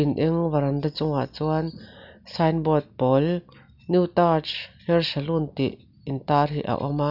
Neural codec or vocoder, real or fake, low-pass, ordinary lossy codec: none; real; 5.4 kHz; none